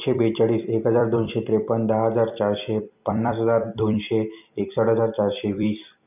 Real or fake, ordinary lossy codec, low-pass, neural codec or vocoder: fake; none; 3.6 kHz; vocoder, 44.1 kHz, 128 mel bands every 256 samples, BigVGAN v2